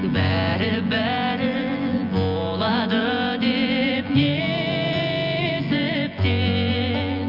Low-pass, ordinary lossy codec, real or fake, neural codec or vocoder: 5.4 kHz; Opus, 64 kbps; fake; vocoder, 24 kHz, 100 mel bands, Vocos